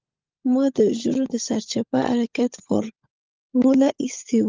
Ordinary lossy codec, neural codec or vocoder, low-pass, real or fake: Opus, 32 kbps; codec, 16 kHz, 16 kbps, FunCodec, trained on LibriTTS, 50 frames a second; 7.2 kHz; fake